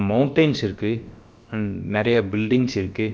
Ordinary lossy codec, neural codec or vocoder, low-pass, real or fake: none; codec, 16 kHz, about 1 kbps, DyCAST, with the encoder's durations; none; fake